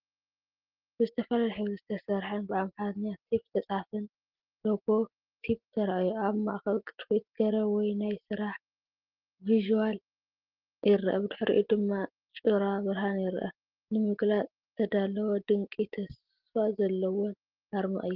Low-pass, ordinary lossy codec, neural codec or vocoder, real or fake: 5.4 kHz; Opus, 16 kbps; none; real